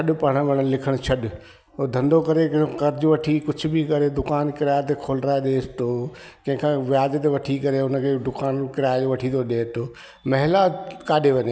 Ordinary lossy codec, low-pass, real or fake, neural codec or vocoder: none; none; real; none